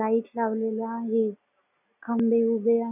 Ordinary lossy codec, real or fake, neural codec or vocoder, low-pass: none; real; none; 3.6 kHz